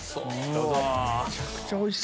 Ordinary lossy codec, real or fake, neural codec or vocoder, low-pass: none; real; none; none